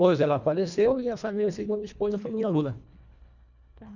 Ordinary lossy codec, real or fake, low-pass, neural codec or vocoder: none; fake; 7.2 kHz; codec, 24 kHz, 1.5 kbps, HILCodec